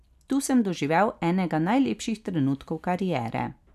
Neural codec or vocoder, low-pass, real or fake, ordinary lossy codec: none; 14.4 kHz; real; none